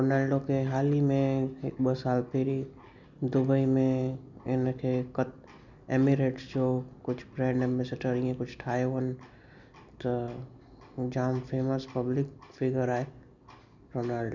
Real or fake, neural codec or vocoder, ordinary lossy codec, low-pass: real; none; none; 7.2 kHz